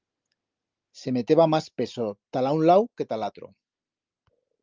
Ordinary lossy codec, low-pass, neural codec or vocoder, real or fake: Opus, 24 kbps; 7.2 kHz; none; real